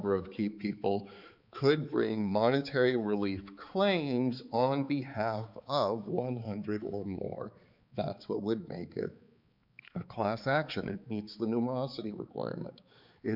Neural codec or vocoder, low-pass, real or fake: codec, 16 kHz, 4 kbps, X-Codec, HuBERT features, trained on balanced general audio; 5.4 kHz; fake